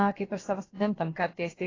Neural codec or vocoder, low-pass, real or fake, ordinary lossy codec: codec, 16 kHz, about 1 kbps, DyCAST, with the encoder's durations; 7.2 kHz; fake; AAC, 32 kbps